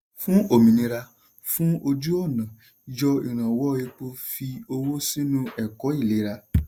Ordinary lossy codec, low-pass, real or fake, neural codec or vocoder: none; none; real; none